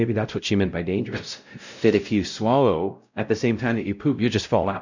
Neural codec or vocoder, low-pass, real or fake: codec, 16 kHz, 0.5 kbps, X-Codec, WavLM features, trained on Multilingual LibriSpeech; 7.2 kHz; fake